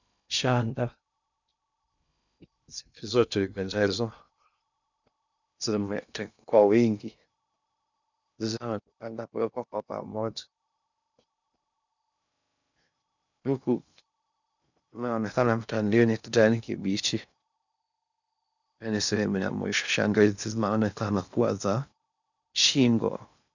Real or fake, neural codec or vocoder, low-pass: fake; codec, 16 kHz in and 24 kHz out, 0.6 kbps, FocalCodec, streaming, 2048 codes; 7.2 kHz